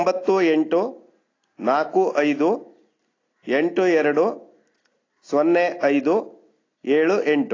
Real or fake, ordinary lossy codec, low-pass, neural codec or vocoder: real; AAC, 32 kbps; 7.2 kHz; none